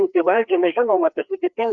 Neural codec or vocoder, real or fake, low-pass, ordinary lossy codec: codec, 16 kHz, 2 kbps, FreqCodec, larger model; fake; 7.2 kHz; Opus, 64 kbps